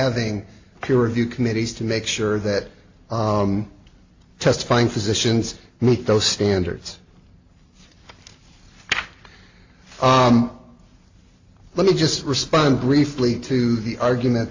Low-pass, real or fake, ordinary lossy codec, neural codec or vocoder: 7.2 kHz; real; AAC, 48 kbps; none